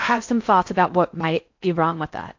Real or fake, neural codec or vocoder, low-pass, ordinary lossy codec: fake; codec, 16 kHz in and 24 kHz out, 0.6 kbps, FocalCodec, streaming, 4096 codes; 7.2 kHz; AAC, 48 kbps